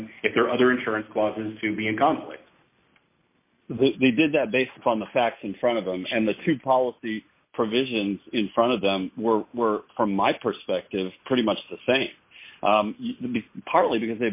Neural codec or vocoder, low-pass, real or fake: none; 3.6 kHz; real